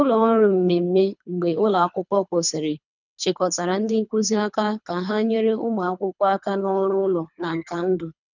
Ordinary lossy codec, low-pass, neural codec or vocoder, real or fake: none; 7.2 kHz; codec, 24 kHz, 3 kbps, HILCodec; fake